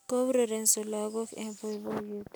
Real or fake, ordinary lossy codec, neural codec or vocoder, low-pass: real; none; none; none